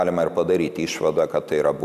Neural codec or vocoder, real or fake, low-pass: none; real; 14.4 kHz